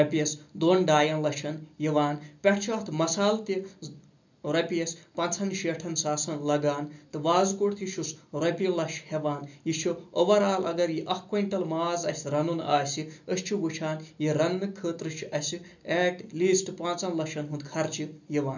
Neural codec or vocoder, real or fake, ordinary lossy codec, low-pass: none; real; none; 7.2 kHz